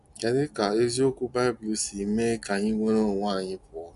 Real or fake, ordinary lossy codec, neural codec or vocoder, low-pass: real; none; none; 10.8 kHz